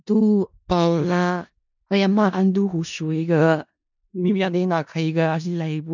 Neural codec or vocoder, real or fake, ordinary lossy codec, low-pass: codec, 16 kHz in and 24 kHz out, 0.4 kbps, LongCat-Audio-Codec, four codebook decoder; fake; none; 7.2 kHz